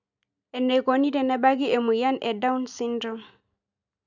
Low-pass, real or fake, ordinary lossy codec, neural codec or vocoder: 7.2 kHz; real; none; none